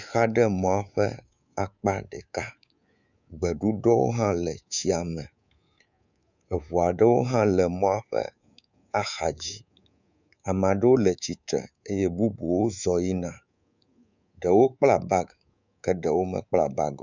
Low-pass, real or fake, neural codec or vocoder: 7.2 kHz; fake; codec, 24 kHz, 3.1 kbps, DualCodec